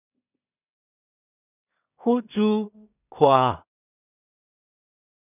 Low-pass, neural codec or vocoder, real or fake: 3.6 kHz; codec, 16 kHz in and 24 kHz out, 0.4 kbps, LongCat-Audio-Codec, two codebook decoder; fake